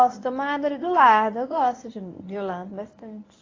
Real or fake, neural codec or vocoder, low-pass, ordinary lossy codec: fake; codec, 24 kHz, 0.9 kbps, WavTokenizer, medium speech release version 1; 7.2 kHz; AAC, 32 kbps